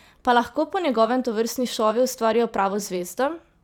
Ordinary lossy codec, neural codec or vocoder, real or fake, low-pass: none; vocoder, 44.1 kHz, 128 mel bands every 512 samples, BigVGAN v2; fake; 19.8 kHz